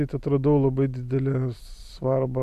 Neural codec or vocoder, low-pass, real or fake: vocoder, 44.1 kHz, 128 mel bands every 256 samples, BigVGAN v2; 14.4 kHz; fake